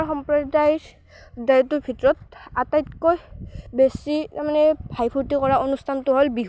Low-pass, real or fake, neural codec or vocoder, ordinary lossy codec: none; real; none; none